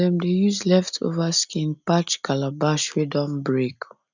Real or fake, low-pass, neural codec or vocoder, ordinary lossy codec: real; 7.2 kHz; none; none